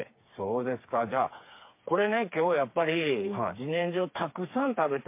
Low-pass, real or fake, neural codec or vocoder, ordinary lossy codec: 3.6 kHz; fake; codec, 16 kHz, 4 kbps, FreqCodec, smaller model; MP3, 24 kbps